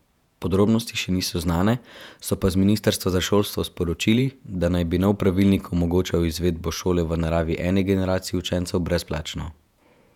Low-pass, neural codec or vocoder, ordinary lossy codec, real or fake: 19.8 kHz; vocoder, 48 kHz, 128 mel bands, Vocos; none; fake